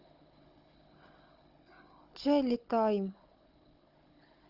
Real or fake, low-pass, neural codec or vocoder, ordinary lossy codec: fake; 5.4 kHz; codec, 16 kHz, 16 kbps, FunCodec, trained on Chinese and English, 50 frames a second; Opus, 24 kbps